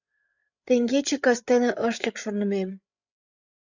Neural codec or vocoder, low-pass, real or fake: codec, 16 kHz, 8 kbps, FreqCodec, larger model; 7.2 kHz; fake